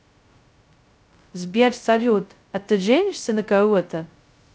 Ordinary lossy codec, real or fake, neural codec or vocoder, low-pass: none; fake; codec, 16 kHz, 0.2 kbps, FocalCodec; none